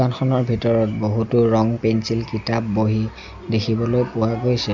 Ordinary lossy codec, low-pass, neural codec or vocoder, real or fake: none; 7.2 kHz; none; real